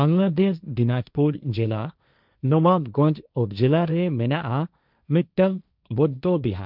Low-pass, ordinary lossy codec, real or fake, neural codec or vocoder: 5.4 kHz; none; fake; codec, 16 kHz, 1.1 kbps, Voila-Tokenizer